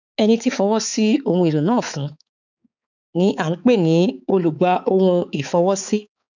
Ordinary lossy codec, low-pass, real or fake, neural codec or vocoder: none; 7.2 kHz; fake; codec, 16 kHz, 4 kbps, X-Codec, HuBERT features, trained on balanced general audio